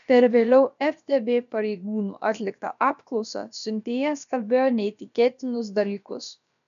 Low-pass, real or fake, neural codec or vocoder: 7.2 kHz; fake; codec, 16 kHz, about 1 kbps, DyCAST, with the encoder's durations